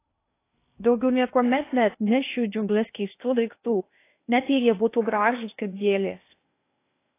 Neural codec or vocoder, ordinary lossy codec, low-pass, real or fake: codec, 16 kHz in and 24 kHz out, 0.6 kbps, FocalCodec, streaming, 2048 codes; AAC, 24 kbps; 3.6 kHz; fake